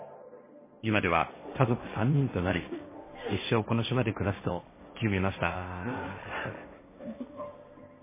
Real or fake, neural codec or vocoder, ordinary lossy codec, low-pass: fake; codec, 16 kHz, 1.1 kbps, Voila-Tokenizer; MP3, 16 kbps; 3.6 kHz